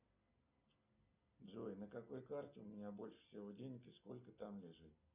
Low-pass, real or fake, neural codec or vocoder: 3.6 kHz; real; none